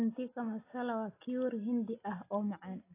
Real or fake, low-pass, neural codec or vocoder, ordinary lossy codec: real; 3.6 kHz; none; AAC, 24 kbps